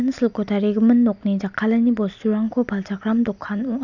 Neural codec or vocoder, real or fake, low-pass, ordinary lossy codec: none; real; 7.2 kHz; none